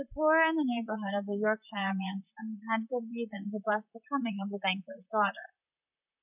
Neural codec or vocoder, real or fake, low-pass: vocoder, 44.1 kHz, 128 mel bands, Pupu-Vocoder; fake; 3.6 kHz